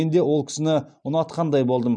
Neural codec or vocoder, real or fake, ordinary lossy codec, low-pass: none; real; MP3, 96 kbps; 9.9 kHz